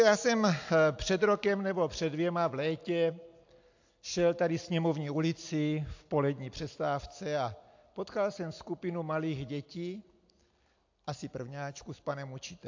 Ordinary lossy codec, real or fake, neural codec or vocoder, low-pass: AAC, 48 kbps; real; none; 7.2 kHz